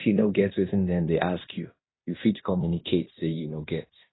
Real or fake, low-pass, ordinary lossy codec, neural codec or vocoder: fake; 7.2 kHz; AAC, 16 kbps; codec, 16 kHz in and 24 kHz out, 0.9 kbps, LongCat-Audio-Codec, fine tuned four codebook decoder